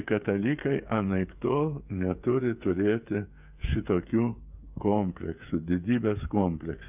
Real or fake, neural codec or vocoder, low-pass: fake; codec, 16 kHz, 8 kbps, FreqCodec, smaller model; 3.6 kHz